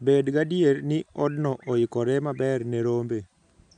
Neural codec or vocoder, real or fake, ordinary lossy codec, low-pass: none; real; none; 9.9 kHz